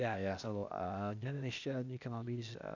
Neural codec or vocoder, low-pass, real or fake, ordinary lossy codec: codec, 16 kHz, 0.8 kbps, ZipCodec; 7.2 kHz; fake; none